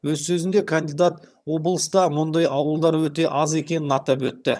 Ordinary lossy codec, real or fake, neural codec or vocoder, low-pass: none; fake; vocoder, 22.05 kHz, 80 mel bands, HiFi-GAN; none